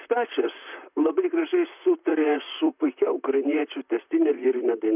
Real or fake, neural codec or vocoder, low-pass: fake; vocoder, 44.1 kHz, 128 mel bands, Pupu-Vocoder; 3.6 kHz